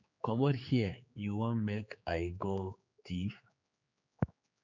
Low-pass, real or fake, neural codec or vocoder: 7.2 kHz; fake; codec, 16 kHz, 4 kbps, X-Codec, HuBERT features, trained on general audio